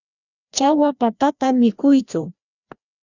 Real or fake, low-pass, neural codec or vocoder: fake; 7.2 kHz; codec, 16 kHz, 1 kbps, FreqCodec, larger model